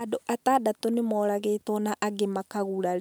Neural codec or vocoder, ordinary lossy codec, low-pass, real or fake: none; none; none; real